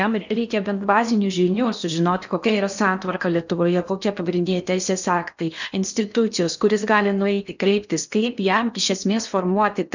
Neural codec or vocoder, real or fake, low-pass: codec, 16 kHz in and 24 kHz out, 0.8 kbps, FocalCodec, streaming, 65536 codes; fake; 7.2 kHz